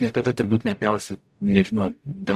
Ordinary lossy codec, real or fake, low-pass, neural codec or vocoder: AAC, 96 kbps; fake; 14.4 kHz; codec, 44.1 kHz, 0.9 kbps, DAC